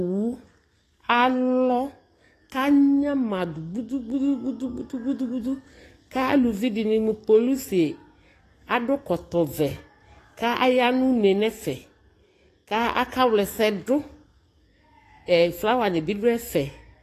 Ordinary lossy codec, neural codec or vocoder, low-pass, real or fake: AAC, 48 kbps; codec, 44.1 kHz, 7.8 kbps, DAC; 14.4 kHz; fake